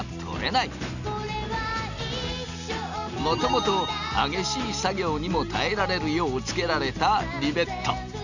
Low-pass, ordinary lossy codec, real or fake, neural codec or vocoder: 7.2 kHz; none; real; none